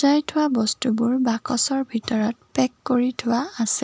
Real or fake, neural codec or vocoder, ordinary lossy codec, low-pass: real; none; none; none